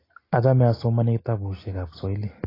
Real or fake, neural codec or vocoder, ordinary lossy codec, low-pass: real; none; AAC, 24 kbps; 5.4 kHz